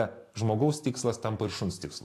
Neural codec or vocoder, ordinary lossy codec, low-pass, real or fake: none; AAC, 64 kbps; 14.4 kHz; real